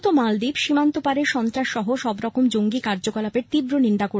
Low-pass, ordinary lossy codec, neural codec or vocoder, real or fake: none; none; none; real